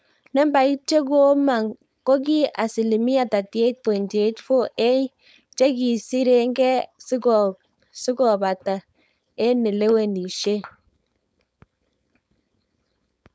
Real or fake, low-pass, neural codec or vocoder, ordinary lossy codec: fake; none; codec, 16 kHz, 4.8 kbps, FACodec; none